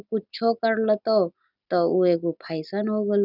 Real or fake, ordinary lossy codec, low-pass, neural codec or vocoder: real; none; 5.4 kHz; none